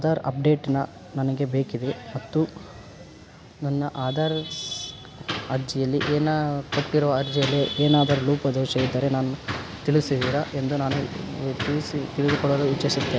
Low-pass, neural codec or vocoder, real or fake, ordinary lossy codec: none; none; real; none